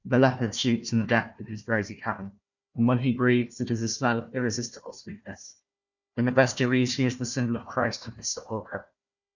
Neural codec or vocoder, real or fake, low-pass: codec, 16 kHz, 1 kbps, FunCodec, trained on Chinese and English, 50 frames a second; fake; 7.2 kHz